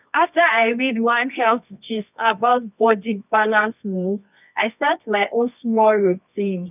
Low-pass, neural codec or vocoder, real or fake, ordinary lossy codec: 3.6 kHz; codec, 24 kHz, 0.9 kbps, WavTokenizer, medium music audio release; fake; none